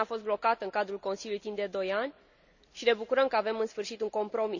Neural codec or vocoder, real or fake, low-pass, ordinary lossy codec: none; real; 7.2 kHz; none